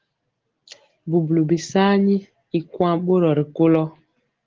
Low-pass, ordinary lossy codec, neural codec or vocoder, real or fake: 7.2 kHz; Opus, 16 kbps; none; real